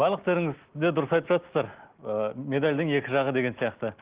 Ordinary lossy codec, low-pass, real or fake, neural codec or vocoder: Opus, 16 kbps; 3.6 kHz; real; none